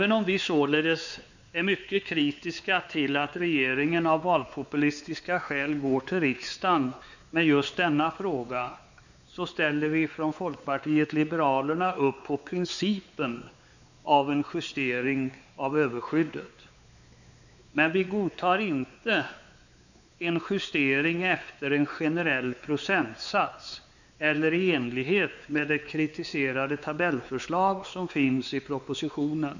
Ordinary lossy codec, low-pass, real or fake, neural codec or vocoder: none; 7.2 kHz; fake; codec, 16 kHz, 4 kbps, X-Codec, WavLM features, trained on Multilingual LibriSpeech